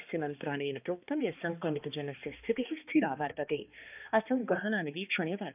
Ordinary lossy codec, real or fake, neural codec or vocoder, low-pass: none; fake; codec, 16 kHz, 2 kbps, X-Codec, HuBERT features, trained on balanced general audio; 3.6 kHz